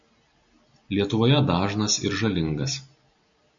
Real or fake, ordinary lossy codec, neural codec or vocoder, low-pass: real; MP3, 64 kbps; none; 7.2 kHz